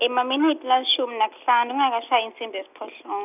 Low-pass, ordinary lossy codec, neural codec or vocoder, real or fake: 3.6 kHz; none; none; real